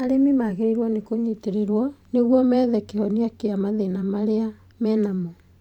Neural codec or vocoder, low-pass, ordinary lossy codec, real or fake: vocoder, 44.1 kHz, 128 mel bands every 512 samples, BigVGAN v2; 19.8 kHz; none; fake